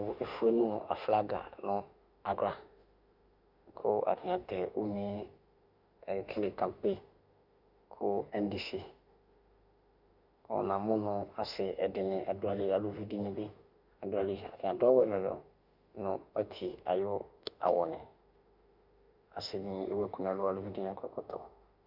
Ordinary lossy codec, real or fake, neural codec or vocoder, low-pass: Opus, 64 kbps; fake; autoencoder, 48 kHz, 32 numbers a frame, DAC-VAE, trained on Japanese speech; 5.4 kHz